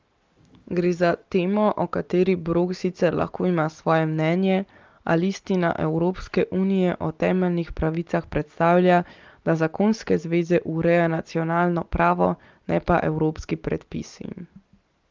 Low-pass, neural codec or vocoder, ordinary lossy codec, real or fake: 7.2 kHz; none; Opus, 32 kbps; real